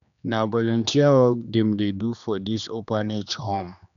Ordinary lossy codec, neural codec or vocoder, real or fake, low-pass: none; codec, 16 kHz, 2 kbps, X-Codec, HuBERT features, trained on general audio; fake; 7.2 kHz